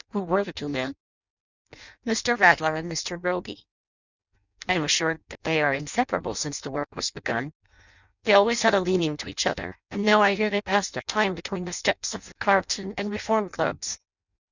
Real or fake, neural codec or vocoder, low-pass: fake; codec, 16 kHz in and 24 kHz out, 0.6 kbps, FireRedTTS-2 codec; 7.2 kHz